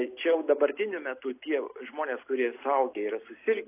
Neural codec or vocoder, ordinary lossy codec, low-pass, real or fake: none; AAC, 24 kbps; 3.6 kHz; real